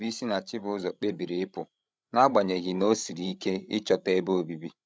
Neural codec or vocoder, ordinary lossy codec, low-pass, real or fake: codec, 16 kHz, 16 kbps, FreqCodec, larger model; none; none; fake